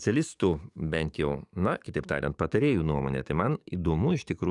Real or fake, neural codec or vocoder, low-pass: fake; codec, 44.1 kHz, 7.8 kbps, DAC; 10.8 kHz